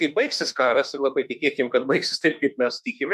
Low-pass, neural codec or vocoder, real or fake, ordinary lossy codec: 14.4 kHz; autoencoder, 48 kHz, 32 numbers a frame, DAC-VAE, trained on Japanese speech; fake; MP3, 96 kbps